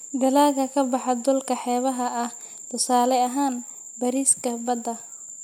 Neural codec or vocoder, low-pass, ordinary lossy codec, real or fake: none; 19.8 kHz; MP3, 96 kbps; real